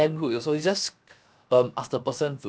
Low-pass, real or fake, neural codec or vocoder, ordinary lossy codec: none; fake; codec, 16 kHz, 0.7 kbps, FocalCodec; none